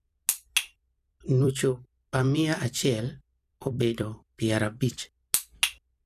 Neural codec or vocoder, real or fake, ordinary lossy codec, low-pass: vocoder, 44.1 kHz, 128 mel bands every 256 samples, BigVGAN v2; fake; none; 14.4 kHz